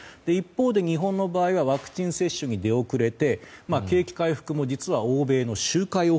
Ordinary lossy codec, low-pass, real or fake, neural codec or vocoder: none; none; real; none